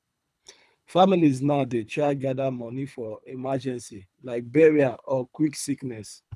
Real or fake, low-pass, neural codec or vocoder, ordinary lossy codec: fake; none; codec, 24 kHz, 3 kbps, HILCodec; none